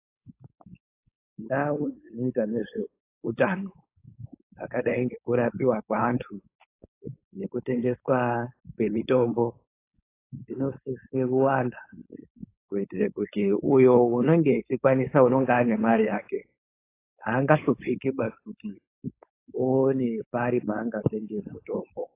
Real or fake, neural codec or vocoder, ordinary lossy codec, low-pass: fake; codec, 16 kHz, 4.8 kbps, FACodec; AAC, 24 kbps; 3.6 kHz